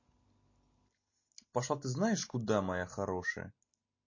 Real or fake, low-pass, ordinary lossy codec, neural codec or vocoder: real; 7.2 kHz; MP3, 32 kbps; none